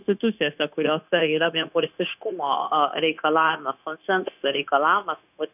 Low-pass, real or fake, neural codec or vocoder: 3.6 kHz; fake; codec, 16 kHz, 0.9 kbps, LongCat-Audio-Codec